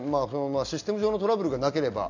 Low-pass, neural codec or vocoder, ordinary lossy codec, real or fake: 7.2 kHz; none; none; real